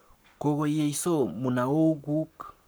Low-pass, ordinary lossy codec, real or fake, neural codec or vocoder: none; none; fake; codec, 44.1 kHz, 7.8 kbps, Pupu-Codec